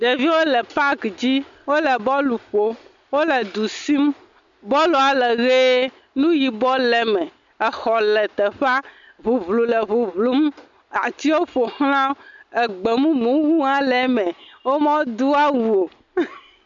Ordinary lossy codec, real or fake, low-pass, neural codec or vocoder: MP3, 64 kbps; real; 7.2 kHz; none